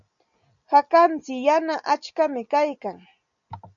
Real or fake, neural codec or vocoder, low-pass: real; none; 7.2 kHz